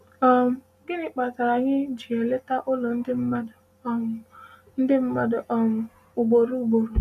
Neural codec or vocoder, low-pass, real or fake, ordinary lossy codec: none; 14.4 kHz; real; none